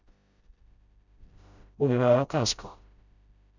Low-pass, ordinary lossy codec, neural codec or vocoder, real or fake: 7.2 kHz; none; codec, 16 kHz, 0.5 kbps, FreqCodec, smaller model; fake